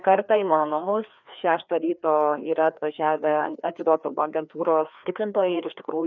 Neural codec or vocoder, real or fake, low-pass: codec, 16 kHz, 2 kbps, FreqCodec, larger model; fake; 7.2 kHz